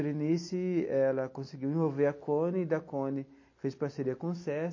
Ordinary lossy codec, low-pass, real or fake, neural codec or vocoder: MP3, 32 kbps; 7.2 kHz; real; none